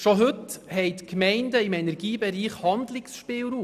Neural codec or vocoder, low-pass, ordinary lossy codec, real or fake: none; 14.4 kHz; none; real